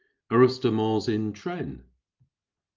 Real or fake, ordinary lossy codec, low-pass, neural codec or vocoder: real; Opus, 24 kbps; 7.2 kHz; none